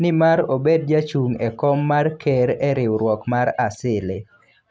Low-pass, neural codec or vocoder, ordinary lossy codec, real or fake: none; none; none; real